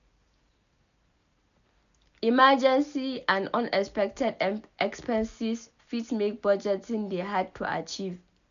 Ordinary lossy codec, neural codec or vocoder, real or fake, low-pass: none; none; real; 7.2 kHz